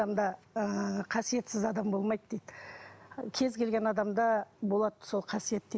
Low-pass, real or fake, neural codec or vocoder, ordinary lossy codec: none; real; none; none